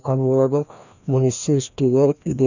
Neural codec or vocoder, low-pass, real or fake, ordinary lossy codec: codec, 16 kHz, 1 kbps, FreqCodec, larger model; 7.2 kHz; fake; none